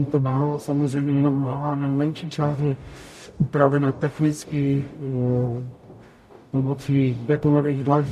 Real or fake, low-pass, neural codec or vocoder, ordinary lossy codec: fake; 14.4 kHz; codec, 44.1 kHz, 0.9 kbps, DAC; MP3, 64 kbps